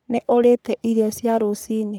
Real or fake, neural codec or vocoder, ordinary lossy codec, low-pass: fake; codec, 44.1 kHz, 3.4 kbps, Pupu-Codec; none; none